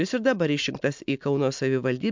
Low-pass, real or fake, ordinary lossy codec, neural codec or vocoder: 7.2 kHz; real; MP3, 64 kbps; none